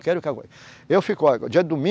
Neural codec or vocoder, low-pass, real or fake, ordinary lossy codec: none; none; real; none